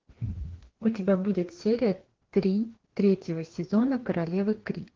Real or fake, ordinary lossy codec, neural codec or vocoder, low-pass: fake; Opus, 16 kbps; codec, 16 kHz, 2 kbps, FreqCodec, larger model; 7.2 kHz